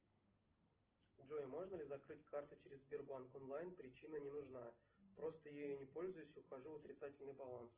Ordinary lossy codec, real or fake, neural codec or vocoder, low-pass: Opus, 16 kbps; real; none; 3.6 kHz